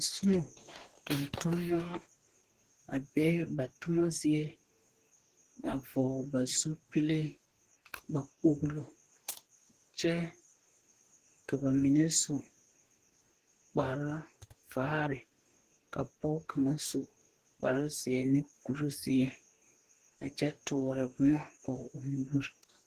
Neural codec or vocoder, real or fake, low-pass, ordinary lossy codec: codec, 44.1 kHz, 2.6 kbps, DAC; fake; 14.4 kHz; Opus, 16 kbps